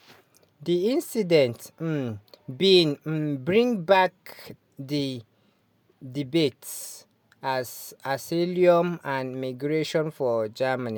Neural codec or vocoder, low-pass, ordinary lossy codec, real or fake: none; none; none; real